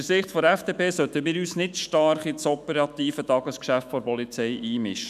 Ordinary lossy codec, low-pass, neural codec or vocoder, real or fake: none; 14.4 kHz; autoencoder, 48 kHz, 128 numbers a frame, DAC-VAE, trained on Japanese speech; fake